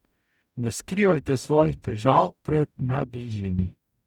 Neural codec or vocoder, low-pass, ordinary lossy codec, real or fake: codec, 44.1 kHz, 0.9 kbps, DAC; 19.8 kHz; none; fake